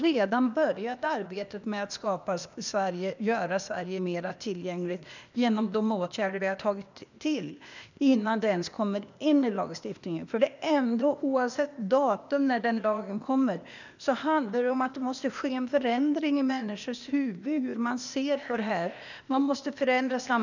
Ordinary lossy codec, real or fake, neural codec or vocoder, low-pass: none; fake; codec, 16 kHz, 0.8 kbps, ZipCodec; 7.2 kHz